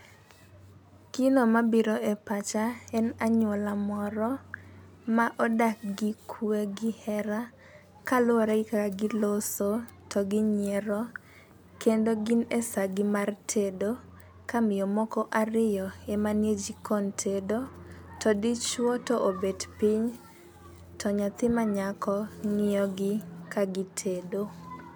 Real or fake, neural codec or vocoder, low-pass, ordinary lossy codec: real; none; none; none